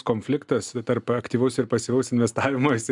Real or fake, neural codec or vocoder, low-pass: real; none; 10.8 kHz